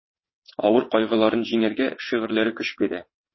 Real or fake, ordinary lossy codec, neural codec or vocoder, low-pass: fake; MP3, 24 kbps; vocoder, 22.05 kHz, 80 mel bands, WaveNeXt; 7.2 kHz